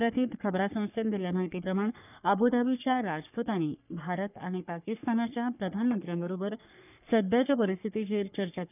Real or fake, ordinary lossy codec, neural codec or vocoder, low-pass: fake; none; codec, 44.1 kHz, 3.4 kbps, Pupu-Codec; 3.6 kHz